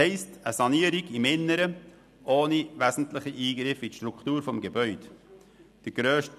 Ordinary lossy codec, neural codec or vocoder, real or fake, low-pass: none; none; real; 14.4 kHz